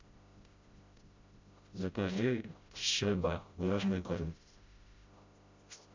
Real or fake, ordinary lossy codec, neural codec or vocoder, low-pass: fake; MP3, 48 kbps; codec, 16 kHz, 0.5 kbps, FreqCodec, smaller model; 7.2 kHz